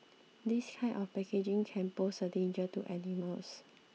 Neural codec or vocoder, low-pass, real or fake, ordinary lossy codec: none; none; real; none